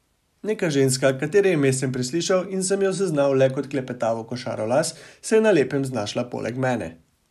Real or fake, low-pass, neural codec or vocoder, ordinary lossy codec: real; 14.4 kHz; none; none